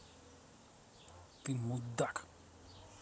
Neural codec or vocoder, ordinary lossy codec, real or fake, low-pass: none; none; real; none